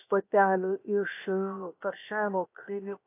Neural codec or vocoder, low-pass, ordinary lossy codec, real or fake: codec, 16 kHz, 0.7 kbps, FocalCodec; 3.6 kHz; AAC, 24 kbps; fake